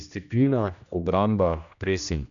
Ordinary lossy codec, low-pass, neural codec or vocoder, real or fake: none; 7.2 kHz; codec, 16 kHz, 1 kbps, X-Codec, HuBERT features, trained on general audio; fake